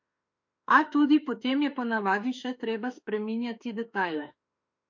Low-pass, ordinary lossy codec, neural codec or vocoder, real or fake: 7.2 kHz; MP3, 48 kbps; autoencoder, 48 kHz, 32 numbers a frame, DAC-VAE, trained on Japanese speech; fake